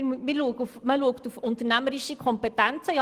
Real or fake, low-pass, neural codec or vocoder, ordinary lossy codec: real; 14.4 kHz; none; Opus, 24 kbps